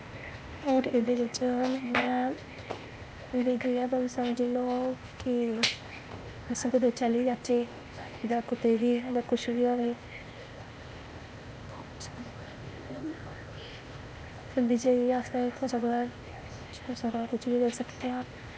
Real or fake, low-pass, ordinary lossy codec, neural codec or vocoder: fake; none; none; codec, 16 kHz, 0.8 kbps, ZipCodec